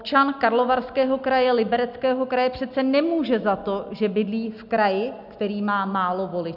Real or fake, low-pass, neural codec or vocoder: real; 5.4 kHz; none